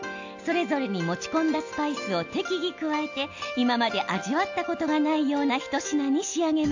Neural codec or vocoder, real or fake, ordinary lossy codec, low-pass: none; real; AAC, 48 kbps; 7.2 kHz